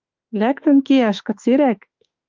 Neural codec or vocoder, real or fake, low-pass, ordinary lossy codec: autoencoder, 48 kHz, 32 numbers a frame, DAC-VAE, trained on Japanese speech; fake; 7.2 kHz; Opus, 32 kbps